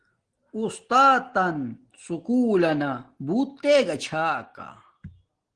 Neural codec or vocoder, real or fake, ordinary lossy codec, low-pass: none; real; Opus, 16 kbps; 9.9 kHz